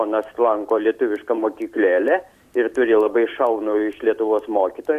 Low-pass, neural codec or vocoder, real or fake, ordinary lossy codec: 14.4 kHz; none; real; Opus, 64 kbps